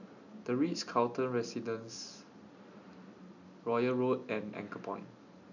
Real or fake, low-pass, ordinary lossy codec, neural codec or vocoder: real; 7.2 kHz; none; none